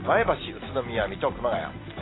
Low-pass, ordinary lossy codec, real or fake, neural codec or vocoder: 7.2 kHz; AAC, 16 kbps; real; none